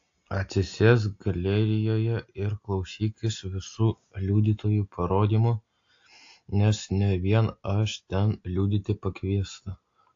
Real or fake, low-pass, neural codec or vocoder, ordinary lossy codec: real; 7.2 kHz; none; AAC, 48 kbps